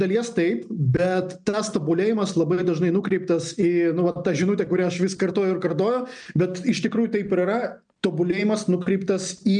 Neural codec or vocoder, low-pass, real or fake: none; 9.9 kHz; real